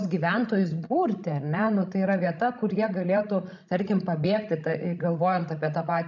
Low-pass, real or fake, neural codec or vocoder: 7.2 kHz; fake; codec, 16 kHz, 16 kbps, FreqCodec, larger model